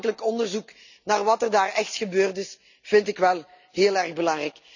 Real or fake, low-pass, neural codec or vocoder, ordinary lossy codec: real; 7.2 kHz; none; none